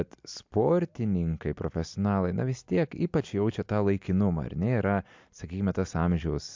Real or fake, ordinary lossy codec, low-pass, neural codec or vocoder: real; MP3, 64 kbps; 7.2 kHz; none